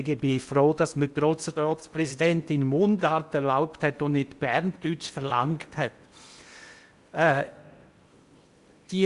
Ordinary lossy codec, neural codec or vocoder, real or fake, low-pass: Opus, 64 kbps; codec, 16 kHz in and 24 kHz out, 0.8 kbps, FocalCodec, streaming, 65536 codes; fake; 10.8 kHz